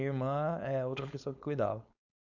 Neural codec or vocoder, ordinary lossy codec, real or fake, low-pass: codec, 16 kHz, 4.8 kbps, FACodec; none; fake; 7.2 kHz